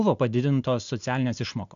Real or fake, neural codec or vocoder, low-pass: real; none; 7.2 kHz